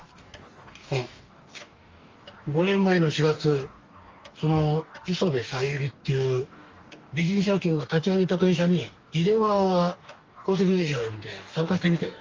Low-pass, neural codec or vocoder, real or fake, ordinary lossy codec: 7.2 kHz; codec, 44.1 kHz, 2.6 kbps, DAC; fake; Opus, 32 kbps